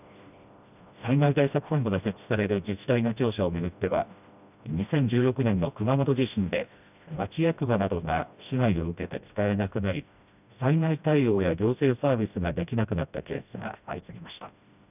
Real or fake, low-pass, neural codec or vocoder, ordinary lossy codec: fake; 3.6 kHz; codec, 16 kHz, 1 kbps, FreqCodec, smaller model; none